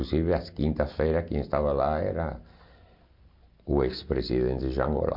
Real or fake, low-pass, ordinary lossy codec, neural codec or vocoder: real; 5.4 kHz; MP3, 48 kbps; none